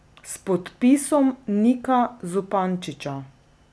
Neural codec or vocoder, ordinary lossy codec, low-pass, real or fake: none; none; none; real